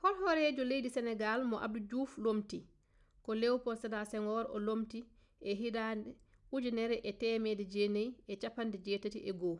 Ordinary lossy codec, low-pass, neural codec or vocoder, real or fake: none; 10.8 kHz; none; real